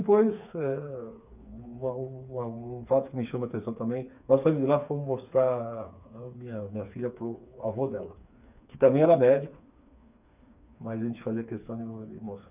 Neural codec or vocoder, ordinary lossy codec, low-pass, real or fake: codec, 16 kHz, 4 kbps, FreqCodec, smaller model; none; 3.6 kHz; fake